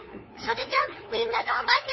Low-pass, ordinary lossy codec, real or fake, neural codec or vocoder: 7.2 kHz; MP3, 24 kbps; fake; codec, 16 kHz, 2 kbps, FunCodec, trained on LibriTTS, 25 frames a second